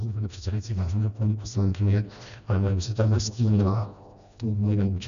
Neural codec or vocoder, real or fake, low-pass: codec, 16 kHz, 1 kbps, FreqCodec, smaller model; fake; 7.2 kHz